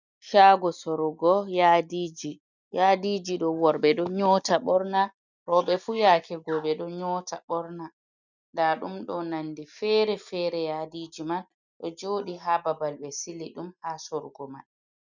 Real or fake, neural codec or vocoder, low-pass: real; none; 7.2 kHz